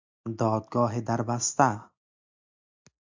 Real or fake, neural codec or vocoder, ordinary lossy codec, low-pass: real; none; MP3, 64 kbps; 7.2 kHz